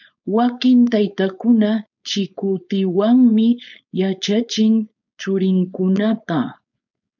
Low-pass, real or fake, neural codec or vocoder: 7.2 kHz; fake; codec, 16 kHz, 4.8 kbps, FACodec